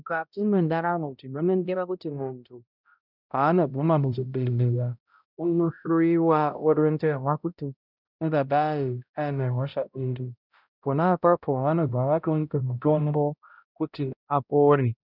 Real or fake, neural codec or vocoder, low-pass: fake; codec, 16 kHz, 0.5 kbps, X-Codec, HuBERT features, trained on balanced general audio; 5.4 kHz